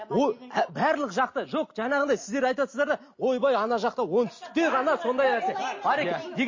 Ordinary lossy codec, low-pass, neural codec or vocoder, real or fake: MP3, 32 kbps; 7.2 kHz; none; real